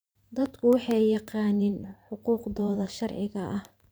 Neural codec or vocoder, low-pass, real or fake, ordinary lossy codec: vocoder, 44.1 kHz, 128 mel bands every 512 samples, BigVGAN v2; none; fake; none